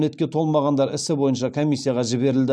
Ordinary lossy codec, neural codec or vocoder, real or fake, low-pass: none; none; real; none